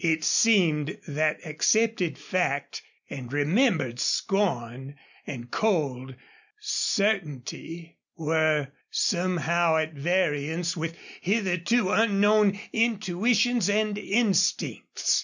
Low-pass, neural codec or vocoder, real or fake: 7.2 kHz; none; real